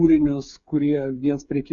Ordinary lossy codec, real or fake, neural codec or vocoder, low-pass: Opus, 64 kbps; fake; codec, 16 kHz, 8 kbps, FreqCodec, smaller model; 7.2 kHz